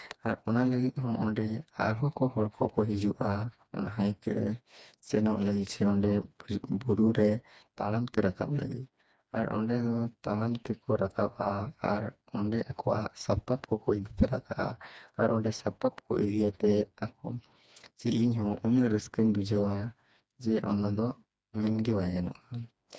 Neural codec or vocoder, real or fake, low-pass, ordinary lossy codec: codec, 16 kHz, 2 kbps, FreqCodec, smaller model; fake; none; none